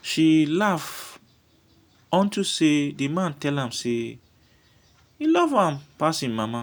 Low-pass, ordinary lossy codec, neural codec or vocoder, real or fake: none; none; none; real